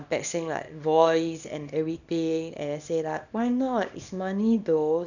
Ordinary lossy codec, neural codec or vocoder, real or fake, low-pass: none; codec, 24 kHz, 0.9 kbps, WavTokenizer, small release; fake; 7.2 kHz